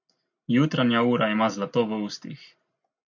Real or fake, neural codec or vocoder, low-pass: real; none; 7.2 kHz